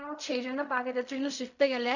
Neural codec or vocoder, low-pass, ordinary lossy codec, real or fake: codec, 16 kHz in and 24 kHz out, 0.4 kbps, LongCat-Audio-Codec, fine tuned four codebook decoder; 7.2 kHz; none; fake